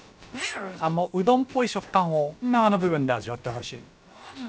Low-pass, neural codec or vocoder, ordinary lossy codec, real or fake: none; codec, 16 kHz, about 1 kbps, DyCAST, with the encoder's durations; none; fake